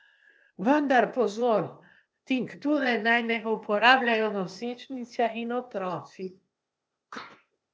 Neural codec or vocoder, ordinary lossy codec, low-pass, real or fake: codec, 16 kHz, 0.8 kbps, ZipCodec; none; none; fake